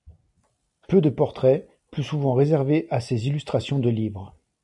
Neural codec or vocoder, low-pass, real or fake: none; 10.8 kHz; real